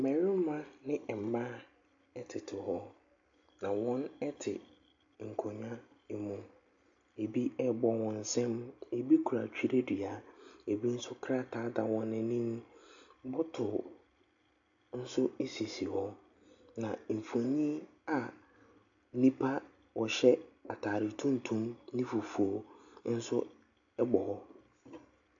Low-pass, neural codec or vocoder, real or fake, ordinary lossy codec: 7.2 kHz; none; real; AAC, 64 kbps